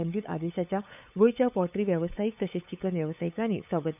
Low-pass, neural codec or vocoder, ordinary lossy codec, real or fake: 3.6 kHz; codec, 16 kHz, 8 kbps, FunCodec, trained on LibriTTS, 25 frames a second; none; fake